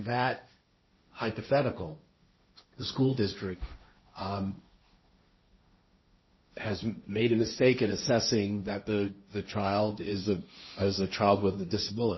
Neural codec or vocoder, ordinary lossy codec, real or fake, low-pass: codec, 16 kHz, 1.1 kbps, Voila-Tokenizer; MP3, 24 kbps; fake; 7.2 kHz